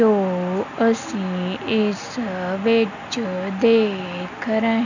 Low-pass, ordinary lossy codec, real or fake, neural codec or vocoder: 7.2 kHz; none; real; none